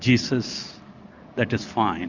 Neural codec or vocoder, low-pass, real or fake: none; 7.2 kHz; real